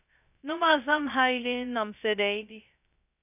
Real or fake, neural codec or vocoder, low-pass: fake; codec, 16 kHz, 0.2 kbps, FocalCodec; 3.6 kHz